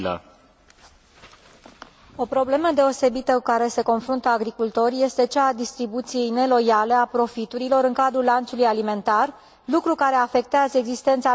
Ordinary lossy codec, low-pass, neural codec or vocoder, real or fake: none; none; none; real